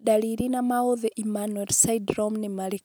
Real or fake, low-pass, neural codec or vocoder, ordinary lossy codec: real; none; none; none